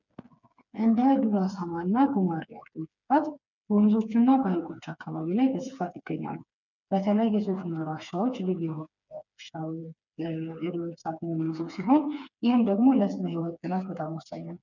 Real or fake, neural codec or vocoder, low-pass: fake; codec, 16 kHz, 4 kbps, FreqCodec, smaller model; 7.2 kHz